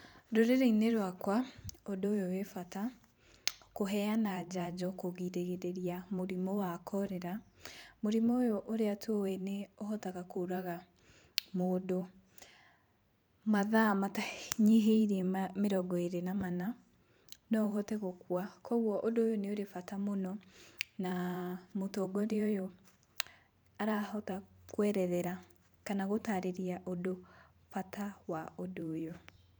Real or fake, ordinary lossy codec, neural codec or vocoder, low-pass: fake; none; vocoder, 44.1 kHz, 128 mel bands every 512 samples, BigVGAN v2; none